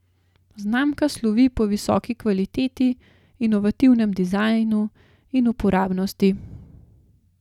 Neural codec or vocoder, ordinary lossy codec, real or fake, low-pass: none; none; real; 19.8 kHz